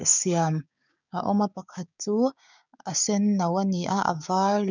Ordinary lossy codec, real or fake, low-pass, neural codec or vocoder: none; fake; 7.2 kHz; codec, 16 kHz, 6 kbps, DAC